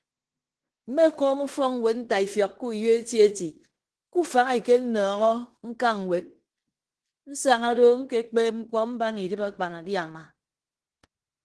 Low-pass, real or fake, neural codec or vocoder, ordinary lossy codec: 10.8 kHz; fake; codec, 16 kHz in and 24 kHz out, 0.9 kbps, LongCat-Audio-Codec, fine tuned four codebook decoder; Opus, 16 kbps